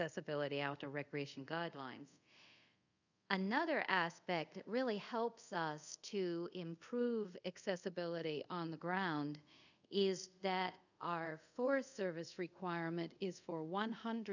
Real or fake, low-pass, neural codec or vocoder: fake; 7.2 kHz; codec, 24 kHz, 0.5 kbps, DualCodec